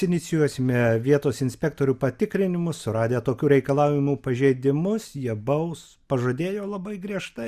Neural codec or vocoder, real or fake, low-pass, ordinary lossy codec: none; real; 14.4 kHz; Opus, 64 kbps